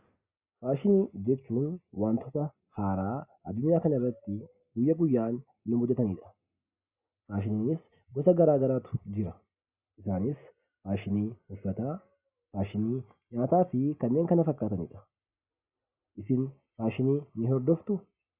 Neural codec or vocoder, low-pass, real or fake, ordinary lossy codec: none; 3.6 kHz; real; Opus, 64 kbps